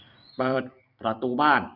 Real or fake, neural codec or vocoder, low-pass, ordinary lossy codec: fake; vocoder, 44.1 kHz, 128 mel bands every 256 samples, BigVGAN v2; 5.4 kHz; none